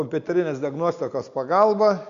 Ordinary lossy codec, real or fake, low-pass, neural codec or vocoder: Opus, 64 kbps; real; 7.2 kHz; none